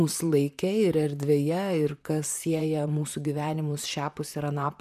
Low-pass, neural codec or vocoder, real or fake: 14.4 kHz; vocoder, 44.1 kHz, 128 mel bands, Pupu-Vocoder; fake